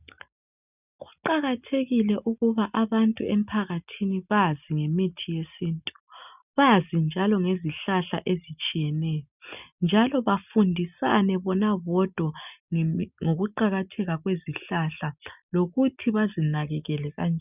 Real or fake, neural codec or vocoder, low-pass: real; none; 3.6 kHz